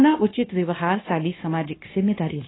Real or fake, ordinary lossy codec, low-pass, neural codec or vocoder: fake; AAC, 16 kbps; 7.2 kHz; codec, 16 kHz, 0.9 kbps, LongCat-Audio-Codec